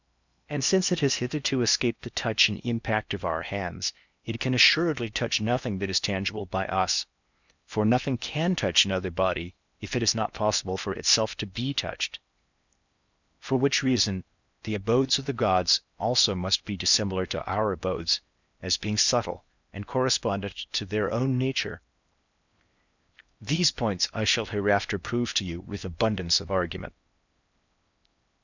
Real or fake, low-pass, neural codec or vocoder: fake; 7.2 kHz; codec, 16 kHz in and 24 kHz out, 0.8 kbps, FocalCodec, streaming, 65536 codes